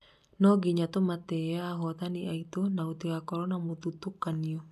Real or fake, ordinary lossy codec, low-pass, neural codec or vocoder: real; none; 14.4 kHz; none